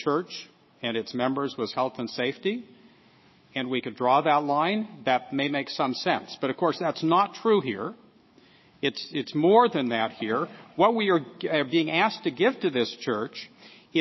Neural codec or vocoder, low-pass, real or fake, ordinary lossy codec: autoencoder, 48 kHz, 128 numbers a frame, DAC-VAE, trained on Japanese speech; 7.2 kHz; fake; MP3, 24 kbps